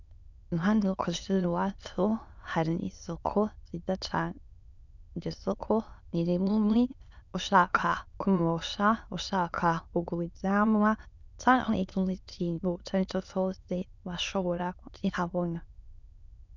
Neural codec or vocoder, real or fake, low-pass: autoencoder, 22.05 kHz, a latent of 192 numbers a frame, VITS, trained on many speakers; fake; 7.2 kHz